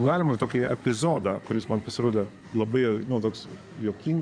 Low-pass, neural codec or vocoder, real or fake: 9.9 kHz; codec, 16 kHz in and 24 kHz out, 2.2 kbps, FireRedTTS-2 codec; fake